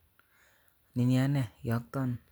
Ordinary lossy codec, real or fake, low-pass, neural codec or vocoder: none; real; none; none